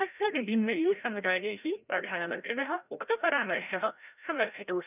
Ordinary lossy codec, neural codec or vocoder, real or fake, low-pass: none; codec, 16 kHz, 0.5 kbps, FreqCodec, larger model; fake; 3.6 kHz